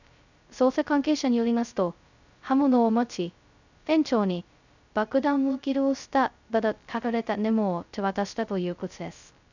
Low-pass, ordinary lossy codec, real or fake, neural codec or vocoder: 7.2 kHz; none; fake; codec, 16 kHz, 0.2 kbps, FocalCodec